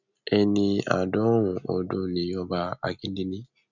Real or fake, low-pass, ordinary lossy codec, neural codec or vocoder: real; 7.2 kHz; none; none